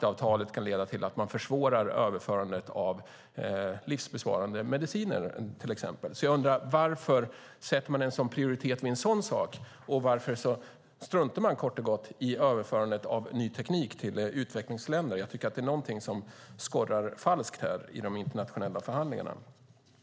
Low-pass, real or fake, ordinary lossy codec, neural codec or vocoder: none; real; none; none